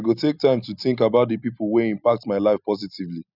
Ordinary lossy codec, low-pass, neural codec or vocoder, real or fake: none; 5.4 kHz; none; real